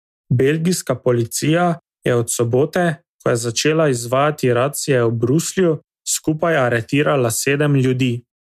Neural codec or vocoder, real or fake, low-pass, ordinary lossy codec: none; real; 14.4 kHz; MP3, 96 kbps